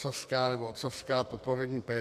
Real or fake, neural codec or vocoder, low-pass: fake; codec, 44.1 kHz, 2.6 kbps, SNAC; 14.4 kHz